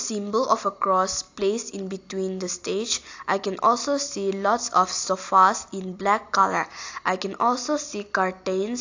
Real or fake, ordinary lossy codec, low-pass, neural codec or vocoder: real; AAC, 48 kbps; 7.2 kHz; none